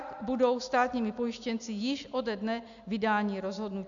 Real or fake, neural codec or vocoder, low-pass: real; none; 7.2 kHz